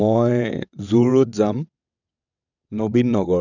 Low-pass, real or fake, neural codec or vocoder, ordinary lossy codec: 7.2 kHz; fake; vocoder, 22.05 kHz, 80 mel bands, WaveNeXt; none